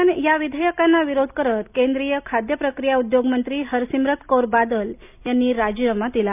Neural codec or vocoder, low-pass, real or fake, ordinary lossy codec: none; 3.6 kHz; real; none